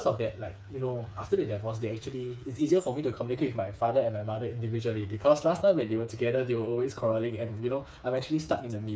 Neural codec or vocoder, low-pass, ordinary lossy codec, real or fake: codec, 16 kHz, 4 kbps, FreqCodec, smaller model; none; none; fake